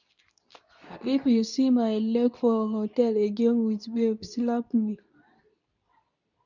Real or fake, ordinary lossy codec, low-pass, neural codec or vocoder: fake; none; 7.2 kHz; codec, 24 kHz, 0.9 kbps, WavTokenizer, medium speech release version 2